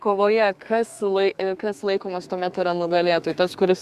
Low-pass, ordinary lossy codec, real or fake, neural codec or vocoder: 14.4 kHz; Opus, 64 kbps; fake; codec, 32 kHz, 1.9 kbps, SNAC